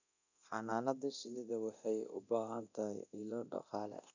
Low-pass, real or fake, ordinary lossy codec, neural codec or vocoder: 7.2 kHz; fake; none; codec, 24 kHz, 0.9 kbps, DualCodec